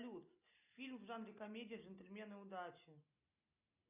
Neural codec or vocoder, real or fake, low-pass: none; real; 3.6 kHz